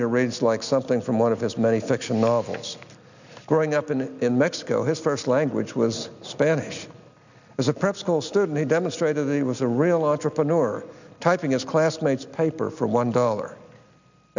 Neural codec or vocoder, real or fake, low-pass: none; real; 7.2 kHz